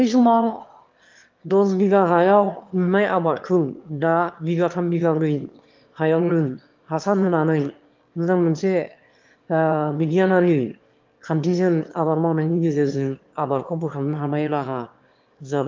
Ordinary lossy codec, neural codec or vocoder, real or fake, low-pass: Opus, 32 kbps; autoencoder, 22.05 kHz, a latent of 192 numbers a frame, VITS, trained on one speaker; fake; 7.2 kHz